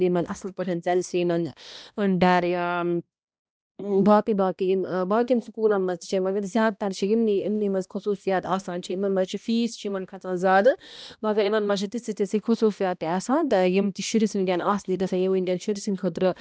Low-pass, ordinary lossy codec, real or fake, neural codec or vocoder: none; none; fake; codec, 16 kHz, 1 kbps, X-Codec, HuBERT features, trained on balanced general audio